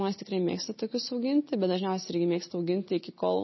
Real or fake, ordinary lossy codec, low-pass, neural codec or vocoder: real; MP3, 24 kbps; 7.2 kHz; none